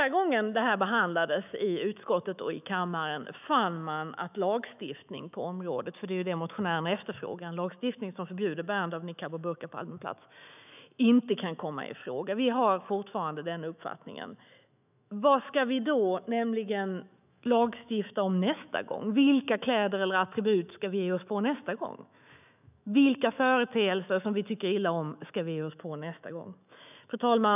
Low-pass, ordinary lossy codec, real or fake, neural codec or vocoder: 3.6 kHz; none; fake; autoencoder, 48 kHz, 128 numbers a frame, DAC-VAE, trained on Japanese speech